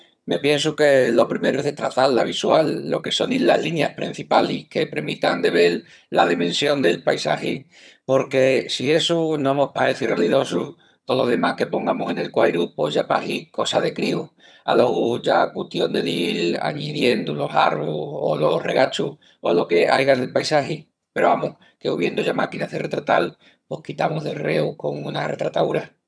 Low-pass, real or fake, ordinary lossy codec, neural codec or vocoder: none; fake; none; vocoder, 22.05 kHz, 80 mel bands, HiFi-GAN